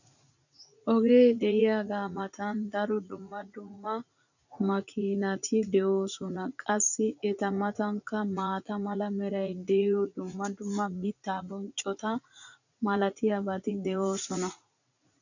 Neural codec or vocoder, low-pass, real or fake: vocoder, 44.1 kHz, 80 mel bands, Vocos; 7.2 kHz; fake